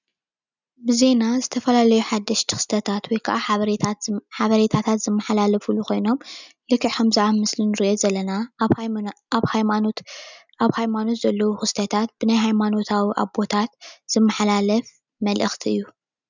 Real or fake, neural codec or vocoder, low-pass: real; none; 7.2 kHz